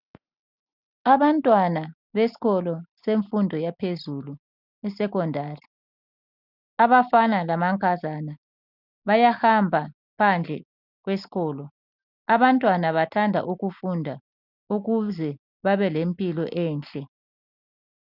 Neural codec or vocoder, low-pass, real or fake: none; 5.4 kHz; real